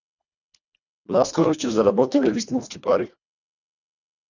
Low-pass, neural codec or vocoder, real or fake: 7.2 kHz; codec, 24 kHz, 1.5 kbps, HILCodec; fake